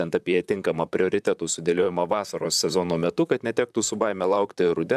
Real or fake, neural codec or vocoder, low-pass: fake; vocoder, 44.1 kHz, 128 mel bands, Pupu-Vocoder; 14.4 kHz